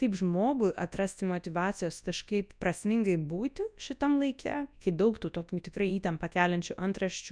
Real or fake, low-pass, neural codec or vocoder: fake; 9.9 kHz; codec, 24 kHz, 0.9 kbps, WavTokenizer, large speech release